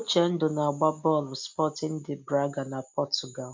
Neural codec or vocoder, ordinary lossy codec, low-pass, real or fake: none; MP3, 64 kbps; 7.2 kHz; real